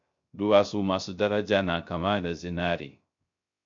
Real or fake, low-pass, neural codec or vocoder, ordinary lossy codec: fake; 7.2 kHz; codec, 16 kHz, 0.3 kbps, FocalCodec; MP3, 48 kbps